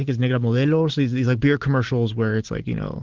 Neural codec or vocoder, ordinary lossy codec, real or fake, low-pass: none; Opus, 16 kbps; real; 7.2 kHz